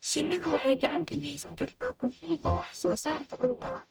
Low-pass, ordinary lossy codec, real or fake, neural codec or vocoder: none; none; fake; codec, 44.1 kHz, 0.9 kbps, DAC